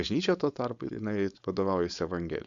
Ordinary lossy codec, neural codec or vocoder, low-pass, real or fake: Opus, 64 kbps; codec, 16 kHz, 4.8 kbps, FACodec; 7.2 kHz; fake